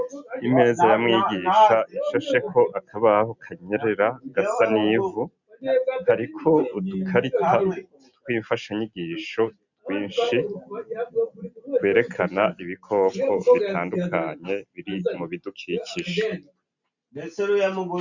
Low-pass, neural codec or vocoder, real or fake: 7.2 kHz; none; real